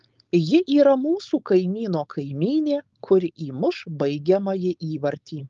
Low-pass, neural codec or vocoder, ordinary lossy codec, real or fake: 7.2 kHz; codec, 16 kHz, 4.8 kbps, FACodec; Opus, 32 kbps; fake